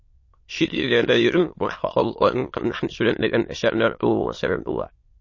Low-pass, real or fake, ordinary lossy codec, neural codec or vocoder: 7.2 kHz; fake; MP3, 32 kbps; autoencoder, 22.05 kHz, a latent of 192 numbers a frame, VITS, trained on many speakers